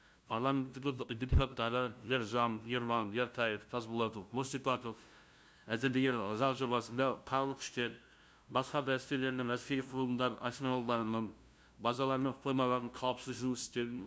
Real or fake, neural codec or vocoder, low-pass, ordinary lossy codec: fake; codec, 16 kHz, 0.5 kbps, FunCodec, trained on LibriTTS, 25 frames a second; none; none